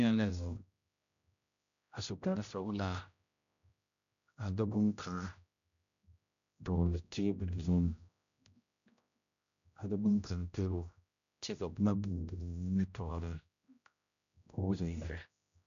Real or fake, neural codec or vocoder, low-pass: fake; codec, 16 kHz, 0.5 kbps, X-Codec, HuBERT features, trained on general audio; 7.2 kHz